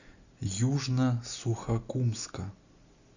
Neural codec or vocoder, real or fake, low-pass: none; real; 7.2 kHz